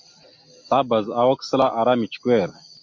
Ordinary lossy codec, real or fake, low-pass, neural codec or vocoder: MP3, 48 kbps; real; 7.2 kHz; none